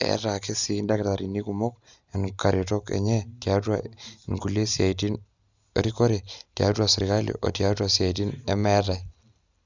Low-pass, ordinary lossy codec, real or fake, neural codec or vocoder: 7.2 kHz; Opus, 64 kbps; real; none